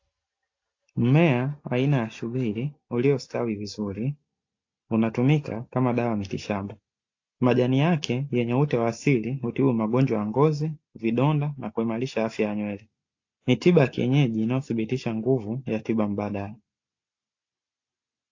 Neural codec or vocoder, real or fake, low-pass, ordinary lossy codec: none; real; 7.2 kHz; AAC, 48 kbps